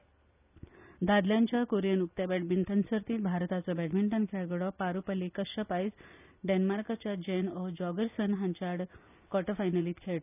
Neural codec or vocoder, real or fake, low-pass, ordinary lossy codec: none; real; 3.6 kHz; none